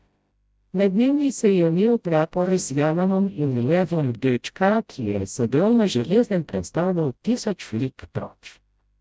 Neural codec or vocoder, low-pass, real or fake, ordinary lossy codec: codec, 16 kHz, 0.5 kbps, FreqCodec, smaller model; none; fake; none